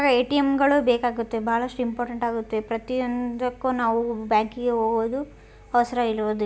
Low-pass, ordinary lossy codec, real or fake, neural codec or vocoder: none; none; real; none